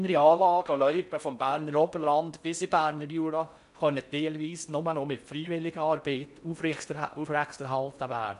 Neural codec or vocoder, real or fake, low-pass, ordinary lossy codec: codec, 16 kHz in and 24 kHz out, 0.6 kbps, FocalCodec, streaming, 4096 codes; fake; 10.8 kHz; none